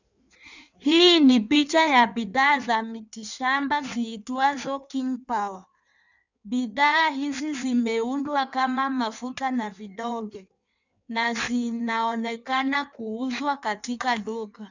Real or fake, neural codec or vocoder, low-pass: fake; codec, 16 kHz in and 24 kHz out, 1.1 kbps, FireRedTTS-2 codec; 7.2 kHz